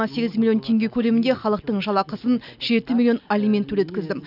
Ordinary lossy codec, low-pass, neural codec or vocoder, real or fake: none; 5.4 kHz; none; real